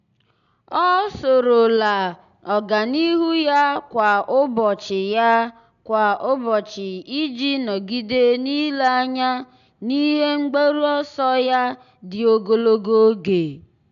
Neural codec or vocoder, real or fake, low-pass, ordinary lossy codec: none; real; 7.2 kHz; AAC, 96 kbps